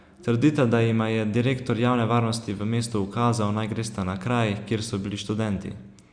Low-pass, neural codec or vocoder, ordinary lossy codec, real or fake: 9.9 kHz; none; Opus, 64 kbps; real